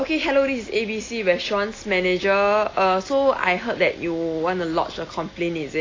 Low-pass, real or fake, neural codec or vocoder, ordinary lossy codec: 7.2 kHz; real; none; AAC, 32 kbps